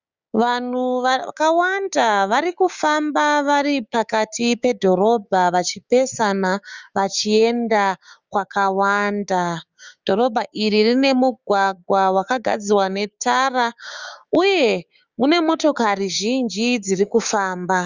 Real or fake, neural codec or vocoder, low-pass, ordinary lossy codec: fake; codec, 16 kHz, 6 kbps, DAC; 7.2 kHz; Opus, 64 kbps